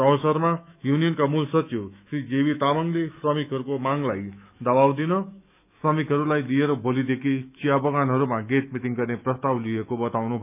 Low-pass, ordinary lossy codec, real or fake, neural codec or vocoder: 3.6 kHz; none; fake; autoencoder, 48 kHz, 128 numbers a frame, DAC-VAE, trained on Japanese speech